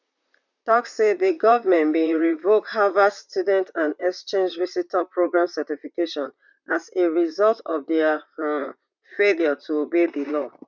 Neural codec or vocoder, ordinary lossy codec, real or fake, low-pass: vocoder, 44.1 kHz, 128 mel bands, Pupu-Vocoder; none; fake; 7.2 kHz